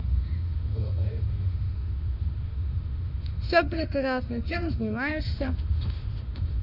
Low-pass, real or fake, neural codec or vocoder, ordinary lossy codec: 5.4 kHz; fake; autoencoder, 48 kHz, 32 numbers a frame, DAC-VAE, trained on Japanese speech; none